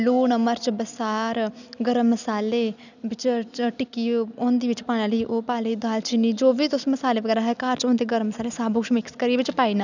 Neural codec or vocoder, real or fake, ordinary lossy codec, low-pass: none; real; none; 7.2 kHz